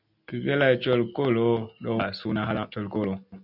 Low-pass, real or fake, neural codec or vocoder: 5.4 kHz; real; none